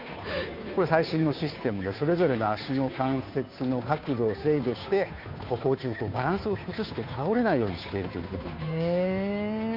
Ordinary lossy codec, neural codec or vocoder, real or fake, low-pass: none; codec, 16 kHz, 2 kbps, FunCodec, trained on Chinese and English, 25 frames a second; fake; 5.4 kHz